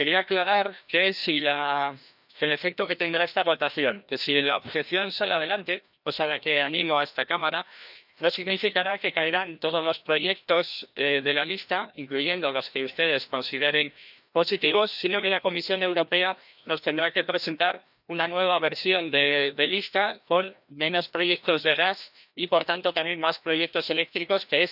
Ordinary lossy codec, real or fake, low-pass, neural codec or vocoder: none; fake; 5.4 kHz; codec, 16 kHz, 1 kbps, FreqCodec, larger model